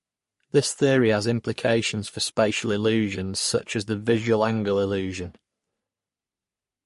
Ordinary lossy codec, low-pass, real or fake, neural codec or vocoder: MP3, 48 kbps; 14.4 kHz; fake; codec, 44.1 kHz, 3.4 kbps, Pupu-Codec